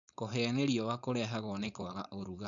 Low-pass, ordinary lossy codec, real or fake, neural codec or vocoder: 7.2 kHz; none; fake; codec, 16 kHz, 4.8 kbps, FACodec